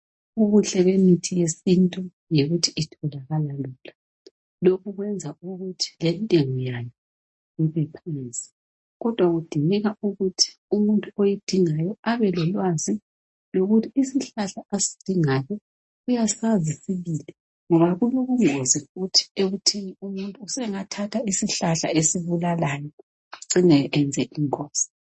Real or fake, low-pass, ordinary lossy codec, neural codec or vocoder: real; 10.8 kHz; MP3, 32 kbps; none